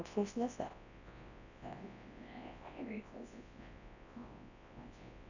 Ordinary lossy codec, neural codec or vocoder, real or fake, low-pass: none; codec, 24 kHz, 0.9 kbps, WavTokenizer, large speech release; fake; 7.2 kHz